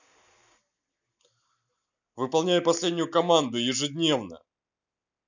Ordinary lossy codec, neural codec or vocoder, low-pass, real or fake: none; none; 7.2 kHz; real